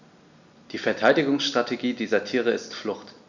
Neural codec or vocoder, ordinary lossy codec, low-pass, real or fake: none; none; 7.2 kHz; real